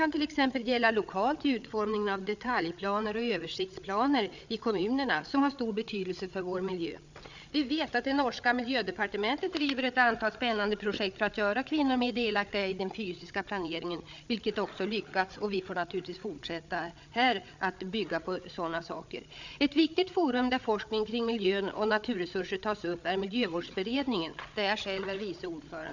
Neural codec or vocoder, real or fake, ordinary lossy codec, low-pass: codec, 16 kHz, 8 kbps, FreqCodec, larger model; fake; none; 7.2 kHz